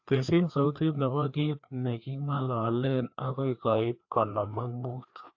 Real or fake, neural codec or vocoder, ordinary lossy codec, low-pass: fake; codec, 16 kHz, 2 kbps, FreqCodec, larger model; AAC, 48 kbps; 7.2 kHz